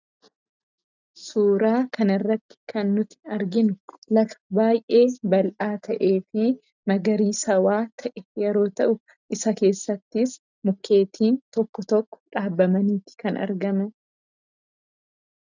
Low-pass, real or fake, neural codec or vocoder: 7.2 kHz; real; none